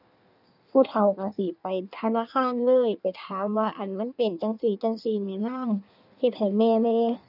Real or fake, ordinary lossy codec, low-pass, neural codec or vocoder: fake; none; 5.4 kHz; codec, 16 kHz in and 24 kHz out, 1.1 kbps, FireRedTTS-2 codec